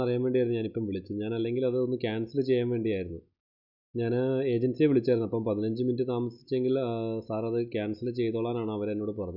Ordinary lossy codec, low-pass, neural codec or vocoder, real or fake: none; 5.4 kHz; none; real